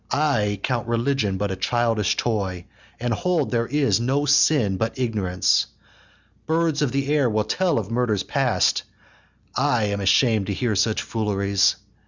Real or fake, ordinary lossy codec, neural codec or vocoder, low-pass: real; Opus, 64 kbps; none; 7.2 kHz